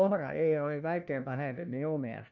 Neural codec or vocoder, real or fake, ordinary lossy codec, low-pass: codec, 16 kHz, 1 kbps, FunCodec, trained on LibriTTS, 50 frames a second; fake; none; 7.2 kHz